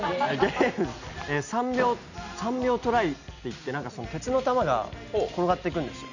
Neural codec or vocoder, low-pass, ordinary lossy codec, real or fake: none; 7.2 kHz; none; real